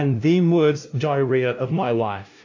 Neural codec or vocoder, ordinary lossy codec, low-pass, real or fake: codec, 16 kHz, 0.5 kbps, FunCodec, trained on LibriTTS, 25 frames a second; AAC, 48 kbps; 7.2 kHz; fake